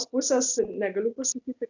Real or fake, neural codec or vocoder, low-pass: real; none; 7.2 kHz